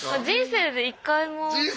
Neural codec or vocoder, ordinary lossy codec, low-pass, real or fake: none; none; none; real